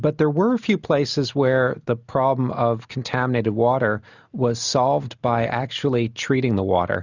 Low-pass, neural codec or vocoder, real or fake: 7.2 kHz; none; real